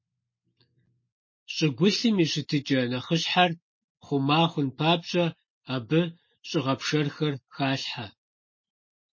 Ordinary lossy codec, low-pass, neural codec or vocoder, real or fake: MP3, 32 kbps; 7.2 kHz; none; real